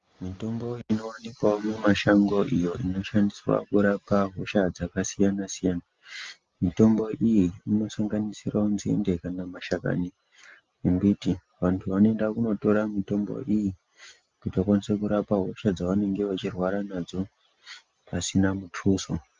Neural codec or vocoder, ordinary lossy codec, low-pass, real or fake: none; Opus, 32 kbps; 7.2 kHz; real